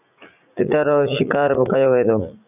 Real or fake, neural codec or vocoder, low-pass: fake; vocoder, 44.1 kHz, 80 mel bands, Vocos; 3.6 kHz